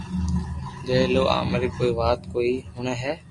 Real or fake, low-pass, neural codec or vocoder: real; 10.8 kHz; none